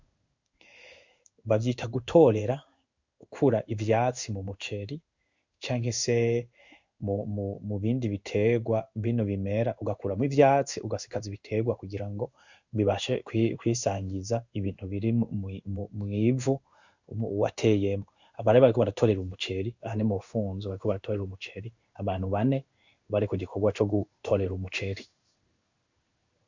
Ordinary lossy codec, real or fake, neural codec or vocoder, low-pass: Opus, 64 kbps; fake; codec, 16 kHz in and 24 kHz out, 1 kbps, XY-Tokenizer; 7.2 kHz